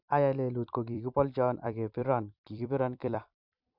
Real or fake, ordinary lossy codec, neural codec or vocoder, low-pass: fake; none; vocoder, 44.1 kHz, 128 mel bands every 256 samples, BigVGAN v2; 5.4 kHz